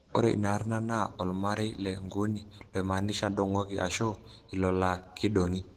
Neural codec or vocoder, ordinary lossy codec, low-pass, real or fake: autoencoder, 48 kHz, 128 numbers a frame, DAC-VAE, trained on Japanese speech; Opus, 16 kbps; 14.4 kHz; fake